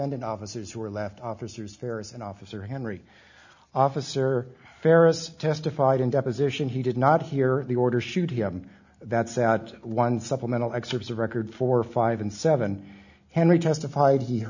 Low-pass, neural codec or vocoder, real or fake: 7.2 kHz; none; real